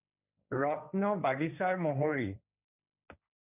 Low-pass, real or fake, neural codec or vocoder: 3.6 kHz; fake; codec, 16 kHz, 1.1 kbps, Voila-Tokenizer